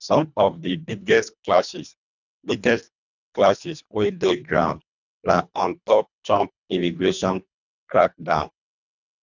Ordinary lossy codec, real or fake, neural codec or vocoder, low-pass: none; fake; codec, 24 kHz, 1.5 kbps, HILCodec; 7.2 kHz